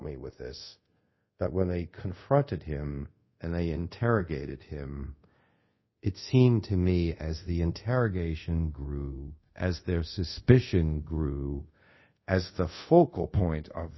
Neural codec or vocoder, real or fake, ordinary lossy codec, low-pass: codec, 24 kHz, 0.5 kbps, DualCodec; fake; MP3, 24 kbps; 7.2 kHz